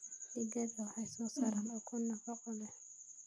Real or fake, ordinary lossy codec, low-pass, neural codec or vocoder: real; none; none; none